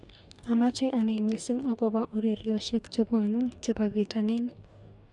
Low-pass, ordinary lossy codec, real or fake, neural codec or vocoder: 10.8 kHz; none; fake; codec, 44.1 kHz, 2.6 kbps, DAC